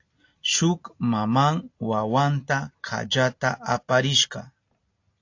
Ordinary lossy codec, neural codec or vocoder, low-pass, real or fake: AAC, 48 kbps; none; 7.2 kHz; real